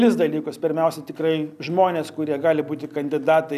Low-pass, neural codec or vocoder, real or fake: 14.4 kHz; none; real